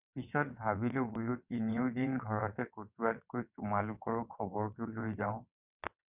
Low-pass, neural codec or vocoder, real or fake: 3.6 kHz; vocoder, 22.05 kHz, 80 mel bands, WaveNeXt; fake